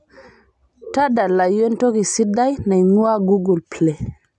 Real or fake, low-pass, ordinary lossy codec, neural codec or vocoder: real; 10.8 kHz; none; none